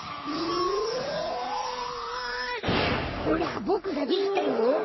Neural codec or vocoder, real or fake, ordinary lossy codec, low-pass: codec, 44.1 kHz, 3.4 kbps, Pupu-Codec; fake; MP3, 24 kbps; 7.2 kHz